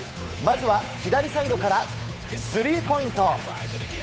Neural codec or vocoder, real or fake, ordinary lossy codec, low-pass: codec, 16 kHz, 8 kbps, FunCodec, trained on Chinese and English, 25 frames a second; fake; none; none